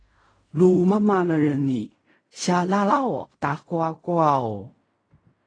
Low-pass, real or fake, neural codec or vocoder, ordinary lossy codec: 9.9 kHz; fake; codec, 16 kHz in and 24 kHz out, 0.4 kbps, LongCat-Audio-Codec, fine tuned four codebook decoder; AAC, 32 kbps